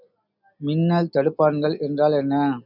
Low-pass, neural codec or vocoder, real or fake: 5.4 kHz; none; real